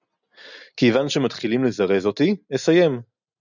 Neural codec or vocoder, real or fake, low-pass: none; real; 7.2 kHz